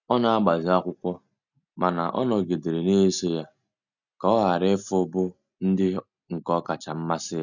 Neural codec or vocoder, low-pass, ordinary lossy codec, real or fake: none; 7.2 kHz; none; real